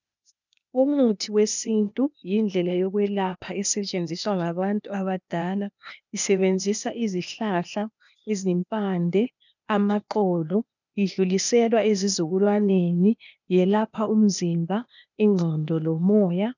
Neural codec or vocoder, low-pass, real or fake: codec, 16 kHz, 0.8 kbps, ZipCodec; 7.2 kHz; fake